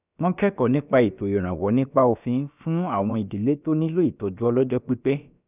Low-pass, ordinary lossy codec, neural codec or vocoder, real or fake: 3.6 kHz; none; codec, 16 kHz, about 1 kbps, DyCAST, with the encoder's durations; fake